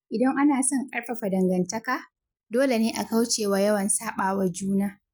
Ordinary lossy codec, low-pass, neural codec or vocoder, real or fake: none; none; none; real